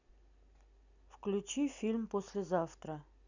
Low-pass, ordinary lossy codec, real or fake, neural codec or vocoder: 7.2 kHz; none; real; none